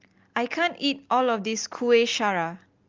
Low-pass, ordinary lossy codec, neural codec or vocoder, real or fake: 7.2 kHz; Opus, 32 kbps; none; real